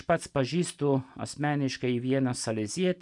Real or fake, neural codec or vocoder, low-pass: real; none; 10.8 kHz